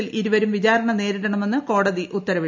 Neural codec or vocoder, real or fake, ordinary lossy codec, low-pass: none; real; MP3, 64 kbps; 7.2 kHz